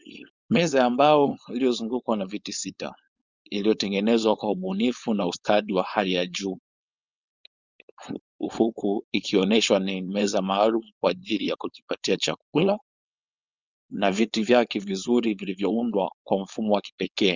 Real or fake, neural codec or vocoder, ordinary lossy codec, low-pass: fake; codec, 16 kHz, 4.8 kbps, FACodec; Opus, 64 kbps; 7.2 kHz